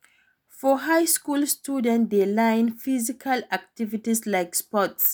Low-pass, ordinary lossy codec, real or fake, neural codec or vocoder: none; none; real; none